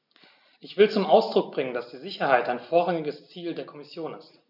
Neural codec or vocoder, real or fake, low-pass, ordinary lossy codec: none; real; 5.4 kHz; none